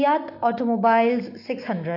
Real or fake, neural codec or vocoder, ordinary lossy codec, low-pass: real; none; AAC, 32 kbps; 5.4 kHz